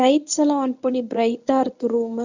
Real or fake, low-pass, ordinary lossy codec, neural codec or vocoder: fake; 7.2 kHz; none; codec, 24 kHz, 0.9 kbps, WavTokenizer, medium speech release version 1